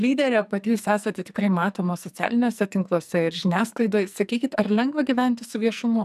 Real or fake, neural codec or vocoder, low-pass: fake; codec, 44.1 kHz, 2.6 kbps, SNAC; 14.4 kHz